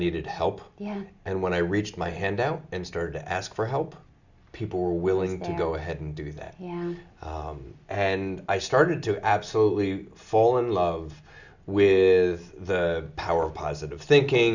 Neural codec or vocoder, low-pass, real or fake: none; 7.2 kHz; real